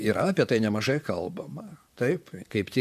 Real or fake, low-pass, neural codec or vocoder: fake; 14.4 kHz; vocoder, 44.1 kHz, 128 mel bands, Pupu-Vocoder